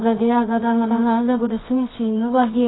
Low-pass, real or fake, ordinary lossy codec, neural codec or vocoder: 7.2 kHz; fake; AAC, 16 kbps; codec, 24 kHz, 0.9 kbps, WavTokenizer, medium music audio release